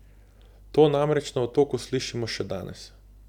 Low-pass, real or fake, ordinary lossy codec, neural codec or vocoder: 19.8 kHz; real; none; none